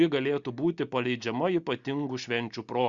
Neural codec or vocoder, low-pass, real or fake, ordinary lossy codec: none; 7.2 kHz; real; Opus, 64 kbps